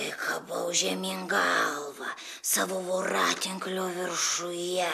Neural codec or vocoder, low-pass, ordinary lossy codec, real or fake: none; 14.4 kHz; AAC, 96 kbps; real